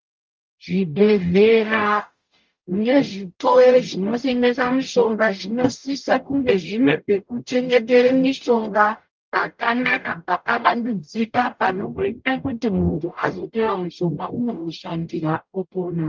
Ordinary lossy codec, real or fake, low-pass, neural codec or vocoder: Opus, 32 kbps; fake; 7.2 kHz; codec, 44.1 kHz, 0.9 kbps, DAC